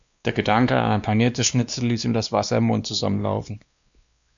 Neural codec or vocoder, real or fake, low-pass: codec, 16 kHz, 2 kbps, X-Codec, WavLM features, trained on Multilingual LibriSpeech; fake; 7.2 kHz